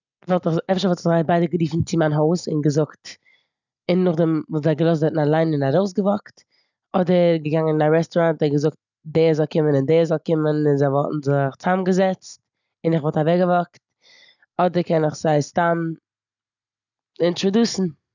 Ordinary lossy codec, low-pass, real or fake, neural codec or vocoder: none; 7.2 kHz; real; none